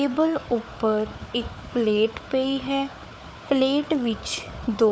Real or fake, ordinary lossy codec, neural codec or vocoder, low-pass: fake; none; codec, 16 kHz, 4 kbps, FunCodec, trained on Chinese and English, 50 frames a second; none